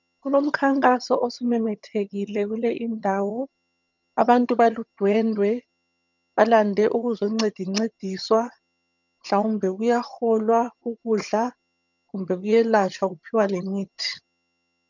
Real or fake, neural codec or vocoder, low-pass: fake; vocoder, 22.05 kHz, 80 mel bands, HiFi-GAN; 7.2 kHz